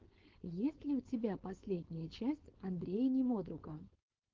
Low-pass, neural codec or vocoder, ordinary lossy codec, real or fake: 7.2 kHz; codec, 16 kHz, 4.8 kbps, FACodec; Opus, 16 kbps; fake